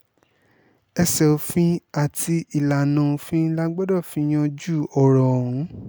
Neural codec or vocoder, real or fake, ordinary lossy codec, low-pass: none; real; none; none